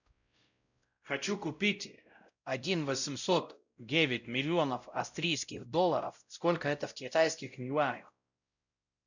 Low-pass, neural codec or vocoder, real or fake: 7.2 kHz; codec, 16 kHz, 0.5 kbps, X-Codec, WavLM features, trained on Multilingual LibriSpeech; fake